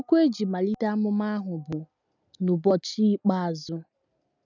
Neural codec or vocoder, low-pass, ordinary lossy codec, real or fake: none; 7.2 kHz; none; real